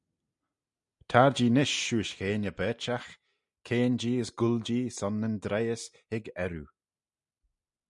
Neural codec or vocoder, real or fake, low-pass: none; real; 10.8 kHz